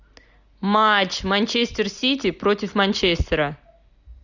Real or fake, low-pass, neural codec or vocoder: real; 7.2 kHz; none